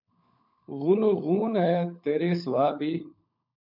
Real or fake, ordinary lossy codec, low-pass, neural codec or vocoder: fake; MP3, 48 kbps; 5.4 kHz; codec, 16 kHz, 16 kbps, FunCodec, trained on LibriTTS, 50 frames a second